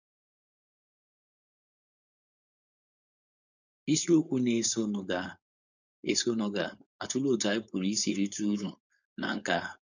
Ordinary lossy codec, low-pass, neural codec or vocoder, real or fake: none; 7.2 kHz; codec, 16 kHz, 4.8 kbps, FACodec; fake